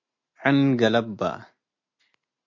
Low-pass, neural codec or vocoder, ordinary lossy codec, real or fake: 7.2 kHz; none; MP3, 48 kbps; real